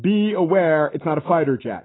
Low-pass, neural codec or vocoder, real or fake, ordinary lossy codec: 7.2 kHz; none; real; AAC, 16 kbps